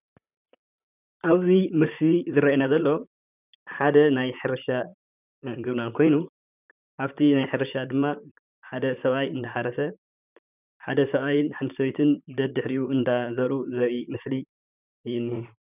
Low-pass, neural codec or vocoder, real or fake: 3.6 kHz; vocoder, 44.1 kHz, 128 mel bands, Pupu-Vocoder; fake